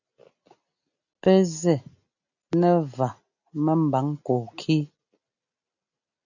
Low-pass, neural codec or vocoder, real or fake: 7.2 kHz; none; real